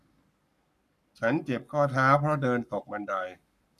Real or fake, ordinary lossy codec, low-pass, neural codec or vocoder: fake; none; 14.4 kHz; codec, 44.1 kHz, 7.8 kbps, Pupu-Codec